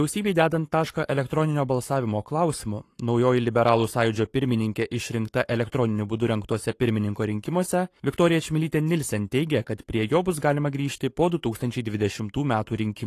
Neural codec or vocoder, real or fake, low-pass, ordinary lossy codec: codec, 44.1 kHz, 7.8 kbps, Pupu-Codec; fake; 14.4 kHz; AAC, 48 kbps